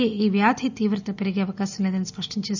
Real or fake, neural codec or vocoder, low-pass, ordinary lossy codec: real; none; 7.2 kHz; none